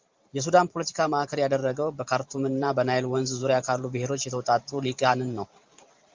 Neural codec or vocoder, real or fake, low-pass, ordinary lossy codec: vocoder, 44.1 kHz, 128 mel bands every 512 samples, BigVGAN v2; fake; 7.2 kHz; Opus, 24 kbps